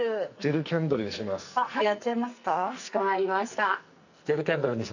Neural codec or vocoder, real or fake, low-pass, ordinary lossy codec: codec, 44.1 kHz, 2.6 kbps, SNAC; fake; 7.2 kHz; none